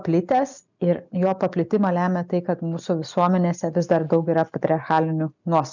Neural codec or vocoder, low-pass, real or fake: none; 7.2 kHz; real